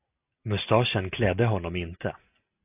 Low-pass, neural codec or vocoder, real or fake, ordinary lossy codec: 3.6 kHz; none; real; AAC, 32 kbps